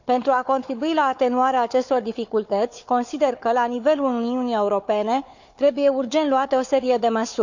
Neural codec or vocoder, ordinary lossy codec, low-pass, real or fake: codec, 16 kHz, 4 kbps, FunCodec, trained on Chinese and English, 50 frames a second; none; 7.2 kHz; fake